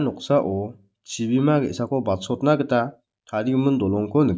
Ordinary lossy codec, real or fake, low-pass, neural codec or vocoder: none; real; none; none